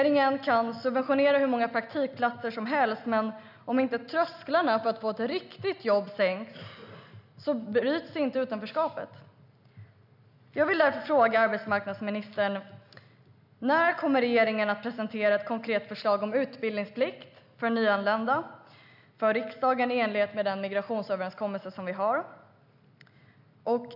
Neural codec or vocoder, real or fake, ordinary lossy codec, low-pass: none; real; none; 5.4 kHz